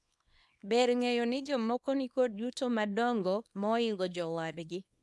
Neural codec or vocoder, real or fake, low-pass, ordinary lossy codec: codec, 24 kHz, 0.9 kbps, WavTokenizer, small release; fake; none; none